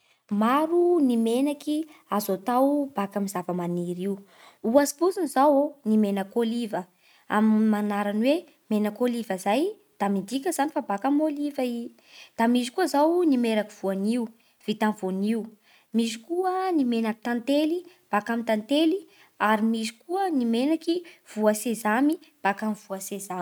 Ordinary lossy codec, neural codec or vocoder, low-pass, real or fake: none; none; none; real